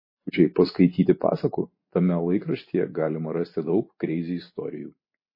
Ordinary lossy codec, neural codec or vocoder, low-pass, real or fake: MP3, 24 kbps; none; 5.4 kHz; real